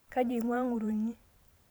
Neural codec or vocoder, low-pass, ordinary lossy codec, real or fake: vocoder, 44.1 kHz, 128 mel bands every 512 samples, BigVGAN v2; none; none; fake